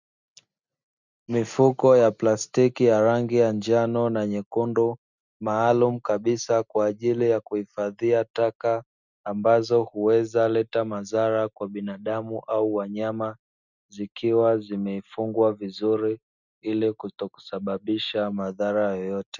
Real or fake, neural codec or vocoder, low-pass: real; none; 7.2 kHz